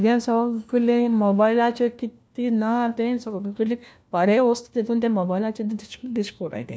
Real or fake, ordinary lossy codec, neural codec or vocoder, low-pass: fake; none; codec, 16 kHz, 1 kbps, FunCodec, trained on LibriTTS, 50 frames a second; none